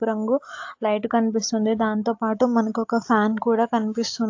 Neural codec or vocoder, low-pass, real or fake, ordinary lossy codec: none; 7.2 kHz; real; AAC, 48 kbps